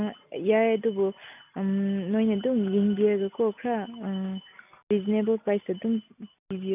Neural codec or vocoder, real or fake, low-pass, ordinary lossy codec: none; real; 3.6 kHz; none